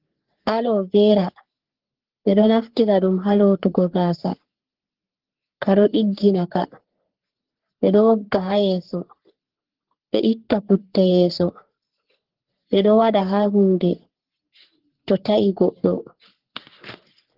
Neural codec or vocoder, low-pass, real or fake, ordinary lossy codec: codec, 44.1 kHz, 3.4 kbps, Pupu-Codec; 5.4 kHz; fake; Opus, 16 kbps